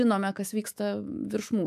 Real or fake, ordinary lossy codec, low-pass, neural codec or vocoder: fake; MP3, 96 kbps; 14.4 kHz; autoencoder, 48 kHz, 128 numbers a frame, DAC-VAE, trained on Japanese speech